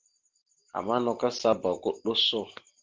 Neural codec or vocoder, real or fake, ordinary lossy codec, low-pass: none; real; Opus, 16 kbps; 7.2 kHz